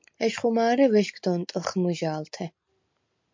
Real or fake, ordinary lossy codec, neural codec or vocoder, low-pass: real; MP3, 48 kbps; none; 7.2 kHz